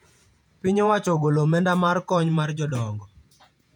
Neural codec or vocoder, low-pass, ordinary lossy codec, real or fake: vocoder, 48 kHz, 128 mel bands, Vocos; 19.8 kHz; none; fake